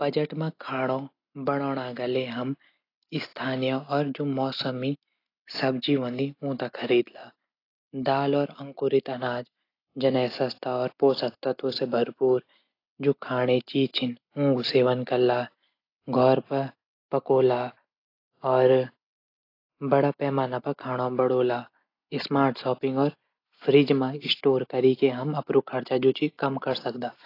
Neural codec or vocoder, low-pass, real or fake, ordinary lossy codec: none; 5.4 kHz; real; AAC, 32 kbps